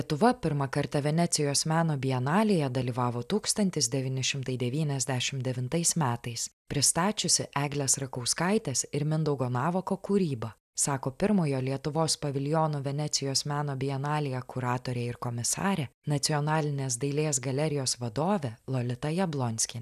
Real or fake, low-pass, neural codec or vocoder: real; 14.4 kHz; none